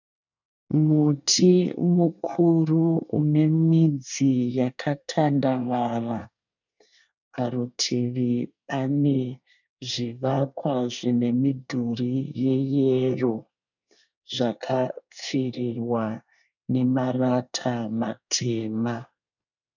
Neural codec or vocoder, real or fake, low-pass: codec, 24 kHz, 1 kbps, SNAC; fake; 7.2 kHz